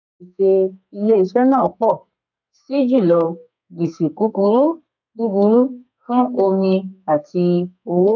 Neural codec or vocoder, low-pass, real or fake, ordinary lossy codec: codec, 32 kHz, 1.9 kbps, SNAC; 7.2 kHz; fake; none